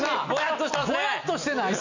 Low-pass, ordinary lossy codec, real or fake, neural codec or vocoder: 7.2 kHz; none; real; none